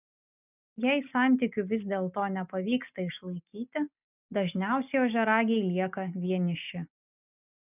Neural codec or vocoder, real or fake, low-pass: none; real; 3.6 kHz